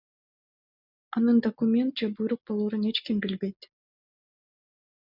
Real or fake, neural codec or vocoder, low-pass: real; none; 5.4 kHz